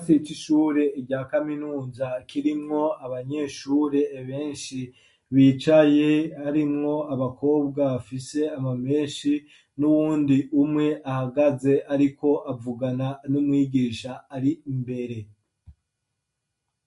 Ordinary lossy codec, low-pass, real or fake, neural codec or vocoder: MP3, 48 kbps; 14.4 kHz; real; none